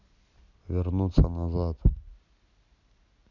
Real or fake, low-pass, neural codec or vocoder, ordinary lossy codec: real; 7.2 kHz; none; none